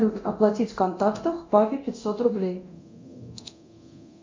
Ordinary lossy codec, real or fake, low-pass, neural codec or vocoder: MP3, 64 kbps; fake; 7.2 kHz; codec, 24 kHz, 0.9 kbps, DualCodec